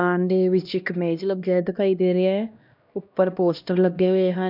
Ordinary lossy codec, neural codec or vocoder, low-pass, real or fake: none; codec, 16 kHz, 1 kbps, X-Codec, HuBERT features, trained on LibriSpeech; 5.4 kHz; fake